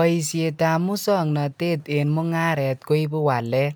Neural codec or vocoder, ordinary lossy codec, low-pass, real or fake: none; none; none; real